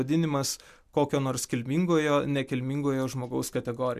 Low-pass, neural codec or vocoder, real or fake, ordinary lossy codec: 14.4 kHz; none; real; MP3, 96 kbps